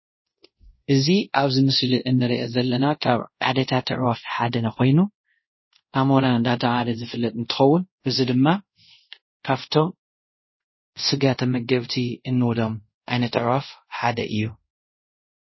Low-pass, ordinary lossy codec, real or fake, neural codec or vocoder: 7.2 kHz; MP3, 24 kbps; fake; codec, 24 kHz, 0.5 kbps, DualCodec